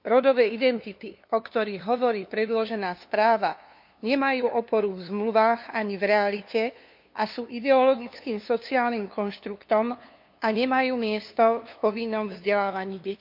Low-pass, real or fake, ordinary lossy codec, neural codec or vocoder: 5.4 kHz; fake; MP3, 48 kbps; codec, 16 kHz, 2 kbps, FunCodec, trained on LibriTTS, 25 frames a second